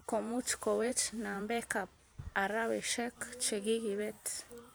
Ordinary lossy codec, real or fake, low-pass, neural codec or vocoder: none; fake; none; vocoder, 44.1 kHz, 128 mel bands every 512 samples, BigVGAN v2